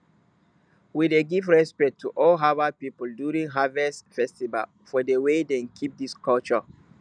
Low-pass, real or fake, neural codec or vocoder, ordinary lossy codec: 9.9 kHz; real; none; none